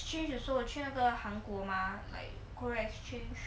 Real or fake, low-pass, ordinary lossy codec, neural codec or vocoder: real; none; none; none